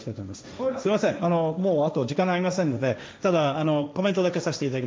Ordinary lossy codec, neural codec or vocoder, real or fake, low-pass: none; codec, 16 kHz, 1.1 kbps, Voila-Tokenizer; fake; none